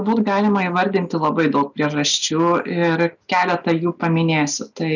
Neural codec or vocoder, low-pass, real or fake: none; 7.2 kHz; real